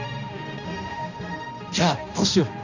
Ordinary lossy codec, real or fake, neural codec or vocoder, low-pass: none; fake; codec, 16 kHz, 1 kbps, X-Codec, HuBERT features, trained on balanced general audio; 7.2 kHz